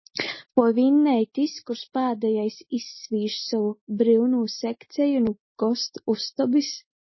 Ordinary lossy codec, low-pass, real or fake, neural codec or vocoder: MP3, 24 kbps; 7.2 kHz; real; none